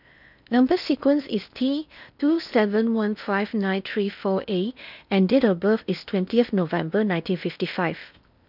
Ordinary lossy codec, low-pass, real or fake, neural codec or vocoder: none; 5.4 kHz; fake; codec, 16 kHz in and 24 kHz out, 0.8 kbps, FocalCodec, streaming, 65536 codes